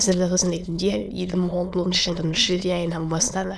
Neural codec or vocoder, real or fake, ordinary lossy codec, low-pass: autoencoder, 22.05 kHz, a latent of 192 numbers a frame, VITS, trained on many speakers; fake; none; none